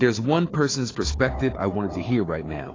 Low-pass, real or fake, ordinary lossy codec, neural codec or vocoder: 7.2 kHz; fake; AAC, 32 kbps; codec, 16 kHz, 4 kbps, FunCodec, trained on Chinese and English, 50 frames a second